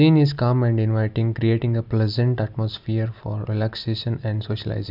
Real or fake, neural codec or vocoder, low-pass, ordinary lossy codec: real; none; 5.4 kHz; none